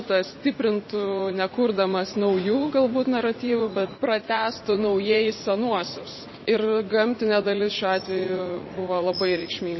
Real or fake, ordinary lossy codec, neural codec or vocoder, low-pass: fake; MP3, 24 kbps; vocoder, 44.1 kHz, 80 mel bands, Vocos; 7.2 kHz